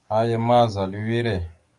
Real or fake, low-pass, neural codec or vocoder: fake; 10.8 kHz; codec, 44.1 kHz, 7.8 kbps, DAC